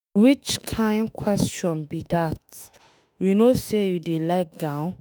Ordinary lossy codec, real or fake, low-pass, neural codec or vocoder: none; fake; none; autoencoder, 48 kHz, 32 numbers a frame, DAC-VAE, trained on Japanese speech